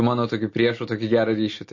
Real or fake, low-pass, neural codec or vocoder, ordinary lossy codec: real; 7.2 kHz; none; MP3, 32 kbps